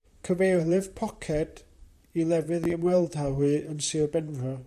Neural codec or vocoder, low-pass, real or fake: vocoder, 44.1 kHz, 128 mel bands, Pupu-Vocoder; 14.4 kHz; fake